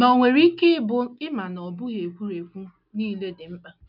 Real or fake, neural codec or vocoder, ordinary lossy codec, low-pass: real; none; none; 5.4 kHz